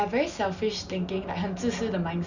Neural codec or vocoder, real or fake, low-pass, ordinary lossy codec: none; real; 7.2 kHz; none